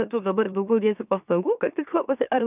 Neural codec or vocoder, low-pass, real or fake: autoencoder, 44.1 kHz, a latent of 192 numbers a frame, MeloTTS; 3.6 kHz; fake